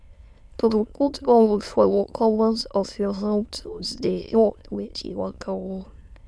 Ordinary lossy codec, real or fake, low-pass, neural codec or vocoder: none; fake; none; autoencoder, 22.05 kHz, a latent of 192 numbers a frame, VITS, trained on many speakers